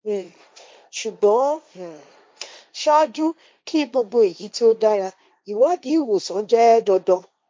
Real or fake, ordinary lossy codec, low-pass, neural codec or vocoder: fake; none; none; codec, 16 kHz, 1.1 kbps, Voila-Tokenizer